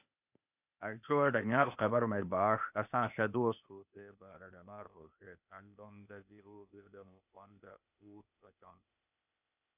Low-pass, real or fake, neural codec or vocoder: 3.6 kHz; fake; codec, 16 kHz, 0.8 kbps, ZipCodec